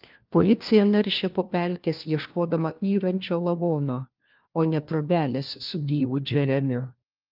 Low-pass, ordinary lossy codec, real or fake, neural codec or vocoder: 5.4 kHz; Opus, 32 kbps; fake; codec, 16 kHz, 1 kbps, FunCodec, trained on LibriTTS, 50 frames a second